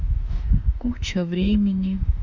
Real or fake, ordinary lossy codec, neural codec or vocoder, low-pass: fake; none; autoencoder, 48 kHz, 32 numbers a frame, DAC-VAE, trained on Japanese speech; 7.2 kHz